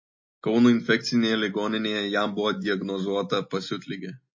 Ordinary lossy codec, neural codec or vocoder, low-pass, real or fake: MP3, 32 kbps; none; 7.2 kHz; real